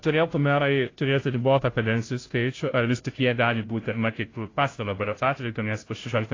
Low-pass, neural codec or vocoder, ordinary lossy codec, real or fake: 7.2 kHz; codec, 16 kHz, 0.5 kbps, FunCodec, trained on Chinese and English, 25 frames a second; AAC, 32 kbps; fake